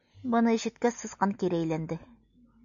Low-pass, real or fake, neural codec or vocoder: 7.2 kHz; real; none